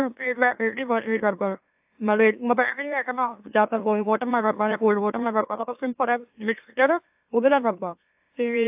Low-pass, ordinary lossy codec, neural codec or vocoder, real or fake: 3.6 kHz; AAC, 32 kbps; autoencoder, 44.1 kHz, a latent of 192 numbers a frame, MeloTTS; fake